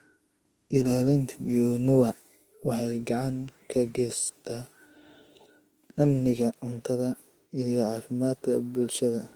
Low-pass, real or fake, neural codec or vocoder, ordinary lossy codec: 19.8 kHz; fake; autoencoder, 48 kHz, 32 numbers a frame, DAC-VAE, trained on Japanese speech; Opus, 24 kbps